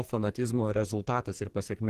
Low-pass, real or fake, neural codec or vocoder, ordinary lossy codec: 14.4 kHz; fake; codec, 44.1 kHz, 2.6 kbps, SNAC; Opus, 16 kbps